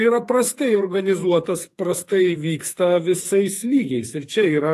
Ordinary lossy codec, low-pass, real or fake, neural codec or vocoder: AAC, 64 kbps; 14.4 kHz; fake; codec, 44.1 kHz, 2.6 kbps, SNAC